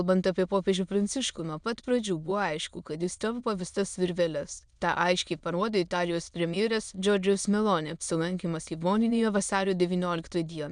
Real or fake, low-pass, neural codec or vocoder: fake; 9.9 kHz; autoencoder, 22.05 kHz, a latent of 192 numbers a frame, VITS, trained on many speakers